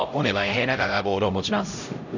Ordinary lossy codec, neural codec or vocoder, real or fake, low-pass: none; codec, 16 kHz, 0.5 kbps, X-Codec, HuBERT features, trained on LibriSpeech; fake; 7.2 kHz